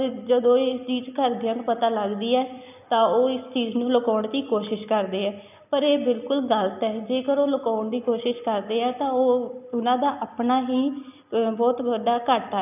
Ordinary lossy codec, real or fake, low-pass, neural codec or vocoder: none; real; 3.6 kHz; none